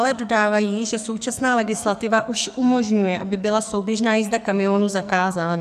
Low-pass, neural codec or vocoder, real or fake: 14.4 kHz; codec, 44.1 kHz, 2.6 kbps, SNAC; fake